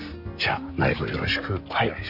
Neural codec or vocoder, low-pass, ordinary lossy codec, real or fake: none; 5.4 kHz; none; real